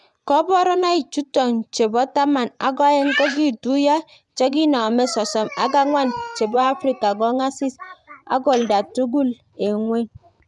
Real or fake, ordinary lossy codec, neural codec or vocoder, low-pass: fake; none; vocoder, 24 kHz, 100 mel bands, Vocos; 10.8 kHz